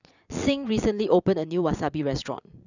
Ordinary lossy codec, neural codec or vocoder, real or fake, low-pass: none; vocoder, 22.05 kHz, 80 mel bands, Vocos; fake; 7.2 kHz